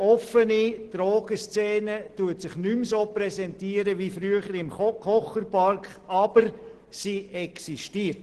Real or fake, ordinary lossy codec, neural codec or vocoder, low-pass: real; Opus, 16 kbps; none; 10.8 kHz